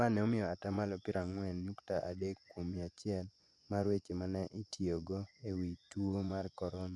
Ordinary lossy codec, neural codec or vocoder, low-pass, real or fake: none; vocoder, 24 kHz, 100 mel bands, Vocos; none; fake